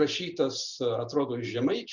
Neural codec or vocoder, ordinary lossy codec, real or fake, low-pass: none; Opus, 64 kbps; real; 7.2 kHz